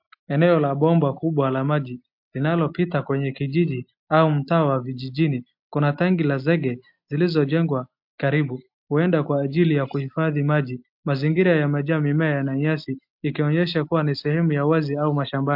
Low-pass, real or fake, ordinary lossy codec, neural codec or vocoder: 5.4 kHz; real; MP3, 48 kbps; none